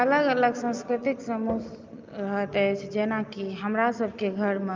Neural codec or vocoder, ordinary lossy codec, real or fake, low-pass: none; Opus, 24 kbps; real; 7.2 kHz